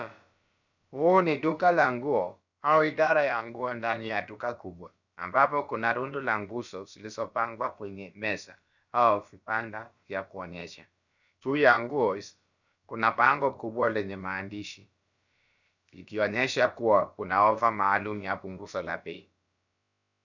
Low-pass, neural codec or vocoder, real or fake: 7.2 kHz; codec, 16 kHz, about 1 kbps, DyCAST, with the encoder's durations; fake